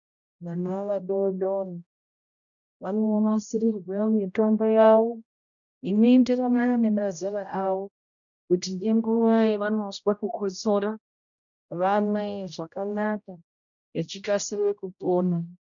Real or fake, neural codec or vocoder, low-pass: fake; codec, 16 kHz, 0.5 kbps, X-Codec, HuBERT features, trained on general audio; 7.2 kHz